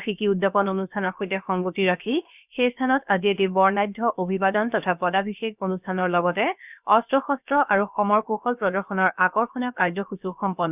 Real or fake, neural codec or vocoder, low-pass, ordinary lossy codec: fake; codec, 16 kHz, about 1 kbps, DyCAST, with the encoder's durations; 3.6 kHz; none